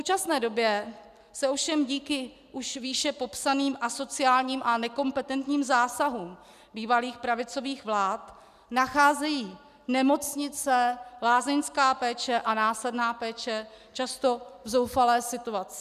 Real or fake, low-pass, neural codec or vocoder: real; 14.4 kHz; none